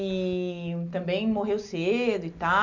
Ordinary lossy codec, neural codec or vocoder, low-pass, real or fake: none; none; 7.2 kHz; real